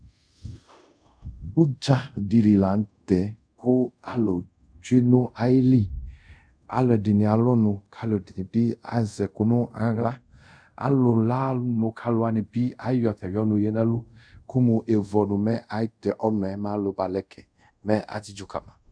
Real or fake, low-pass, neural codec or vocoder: fake; 9.9 kHz; codec, 24 kHz, 0.5 kbps, DualCodec